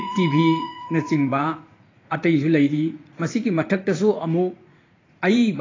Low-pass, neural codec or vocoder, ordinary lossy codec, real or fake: 7.2 kHz; vocoder, 44.1 kHz, 80 mel bands, Vocos; AAC, 32 kbps; fake